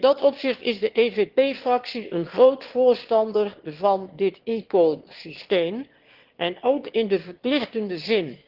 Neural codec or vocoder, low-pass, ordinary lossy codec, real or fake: autoencoder, 22.05 kHz, a latent of 192 numbers a frame, VITS, trained on one speaker; 5.4 kHz; Opus, 16 kbps; fake